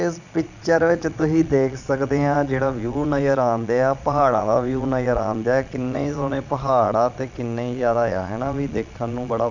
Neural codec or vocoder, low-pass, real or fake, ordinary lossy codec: vocoder, 22.05 kHz, 80 mel bands, Vocos; 7.2 kHz; fake; none